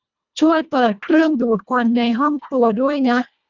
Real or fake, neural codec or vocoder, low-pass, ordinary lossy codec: fake; codec, 24 kHz, 1.5 kbps, HILCodec; 7.2 kHz; none